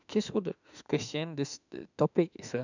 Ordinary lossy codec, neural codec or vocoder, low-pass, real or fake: none; autoencoder, 48 kHz, 32 numbers a frame, DAC-VAE, trained on Japanese speech; 7.2 kHz; fake